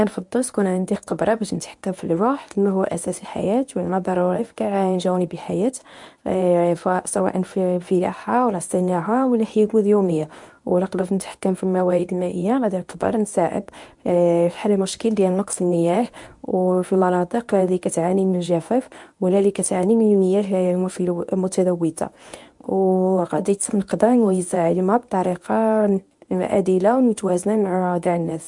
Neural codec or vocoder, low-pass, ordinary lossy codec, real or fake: codec, 24 kHz, 0.9 kbps, WavTokenizer, medium speech release version 1; 10.8 kHz; MP3, 64 kbps; fake